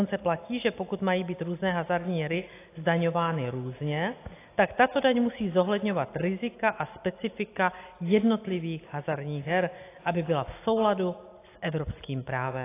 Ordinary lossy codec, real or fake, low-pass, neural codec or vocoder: AAC, 24 kbps; real; 3.6 kHz; none